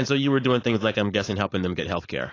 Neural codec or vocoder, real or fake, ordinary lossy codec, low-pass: codec, 16 kHz, 4.8 kbps, FACodec; fake; AAC, 32 kbps; 7.2 kHz